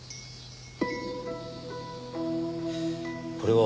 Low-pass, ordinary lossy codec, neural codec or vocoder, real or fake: none; none; none; real